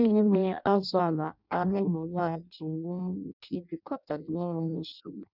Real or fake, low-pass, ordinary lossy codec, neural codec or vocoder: fake; 5.4 kHz; none; codec, 16 kHz in and 24 kHz out, 0.6 kbps, FireRedTTS-2 codec